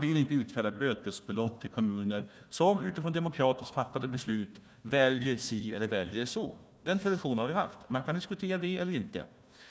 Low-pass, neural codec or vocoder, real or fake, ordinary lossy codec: none; codec, 16 kHz, 1 kbps, FunCodec, trained on Chinese and English, 50 frames a second; fake; none